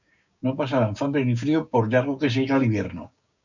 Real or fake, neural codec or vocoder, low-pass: fake; codec, 16 kHz, 6 kbps, DAC; 7.2 kHz